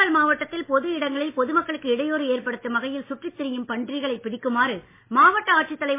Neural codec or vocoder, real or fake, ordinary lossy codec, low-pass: none; real; MP3, 24 kbps; 3.6 kHz